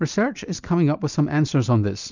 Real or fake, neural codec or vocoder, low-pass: real; none; 7.2 kHz